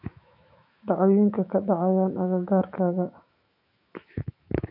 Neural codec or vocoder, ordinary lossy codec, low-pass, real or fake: autoencoder, 48 kHz, 128 numbers a frame, DAC-VAE, trained on Japanese speech; none; 5.4 kHz; fake